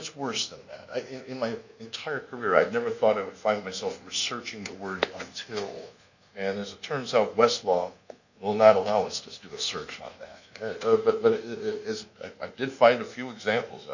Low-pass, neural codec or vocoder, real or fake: 7.2 kHz; codec, 24 kHz, 1.2 kbps, DualCodec; fake